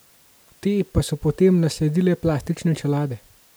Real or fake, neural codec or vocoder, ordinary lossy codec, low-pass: real; none; none; none